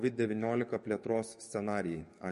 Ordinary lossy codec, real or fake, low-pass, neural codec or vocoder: MP3, 48 kbps; fake; 14.4 kHz; codec, 44.1 kHz, 7.8 kbps, DAC